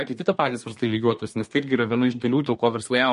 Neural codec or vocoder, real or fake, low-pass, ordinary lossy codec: codec, 24 kHz, 1 kbps, SNAC; fake; 10.8 kHz; MP3, 48 kbps